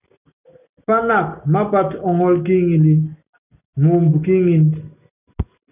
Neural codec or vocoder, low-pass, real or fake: none; 3.6 kHz; real